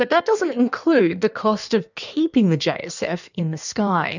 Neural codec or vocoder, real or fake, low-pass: codec, 16 kHz in and 24 kHz out, 1.1 kbps, FireRedTTS-2 codec; fake; 7.2 kHz